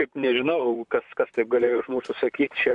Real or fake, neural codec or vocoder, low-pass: fake; vocoder, 44.1 kHz, 128 mel bands, Pupu-Vocoder; 10.8 kHz